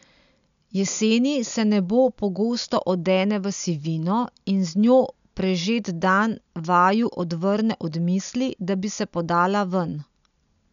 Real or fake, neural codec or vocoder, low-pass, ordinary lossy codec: real; none; 7.2 kHz; none